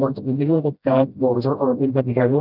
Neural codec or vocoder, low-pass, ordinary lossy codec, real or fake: codec, 16 kHz, 0.5 kbps, FreqCodec, smaller model; 5.4 kHz; none; fake